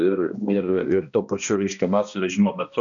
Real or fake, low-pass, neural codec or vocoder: fake; 7.2 kHz; codec, 16 kHz, 1 kbps, X-Codec, HuBERT features, trained on balanced general audio